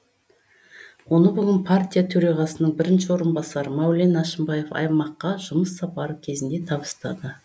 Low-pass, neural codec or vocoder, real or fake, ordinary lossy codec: none; none; real; none